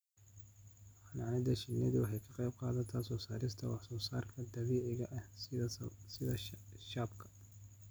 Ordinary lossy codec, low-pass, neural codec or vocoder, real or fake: none; none; none; real